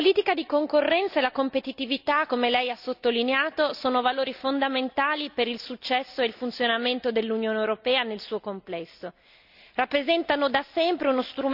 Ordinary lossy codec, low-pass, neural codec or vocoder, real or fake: none; 5.4 kHz; none; real